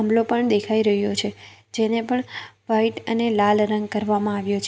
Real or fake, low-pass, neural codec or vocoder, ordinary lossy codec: real; none; none; none